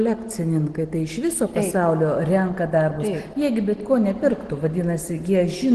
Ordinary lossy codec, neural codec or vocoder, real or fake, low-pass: Opus, 16 kbps; none; real; 10.8 kHz